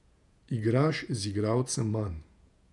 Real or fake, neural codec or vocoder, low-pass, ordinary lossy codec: fake; vocoder, 48 kHz, 128 mel bands, Vocos; 10.8 kHz; none